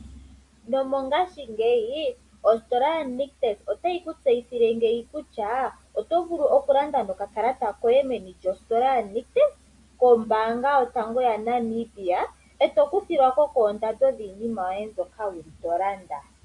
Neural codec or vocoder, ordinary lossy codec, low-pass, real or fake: none; AAC, 64 kbps; 10.8 kHz; real